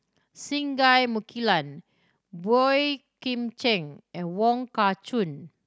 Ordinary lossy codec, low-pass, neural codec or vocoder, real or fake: none; none; none; real